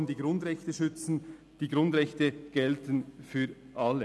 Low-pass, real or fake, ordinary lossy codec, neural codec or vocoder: none; real; none; none